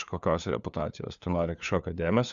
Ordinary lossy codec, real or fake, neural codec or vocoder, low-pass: Opus, 64 kbps; fake; codec, 16 kHz, 4 kbps, FunCodec, trained on LibriTTS, 50 frames a second; 7.2 kHz